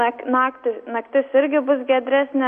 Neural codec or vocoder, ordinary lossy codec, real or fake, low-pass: none; MP3, 48 kbps; real; 14.4 kHz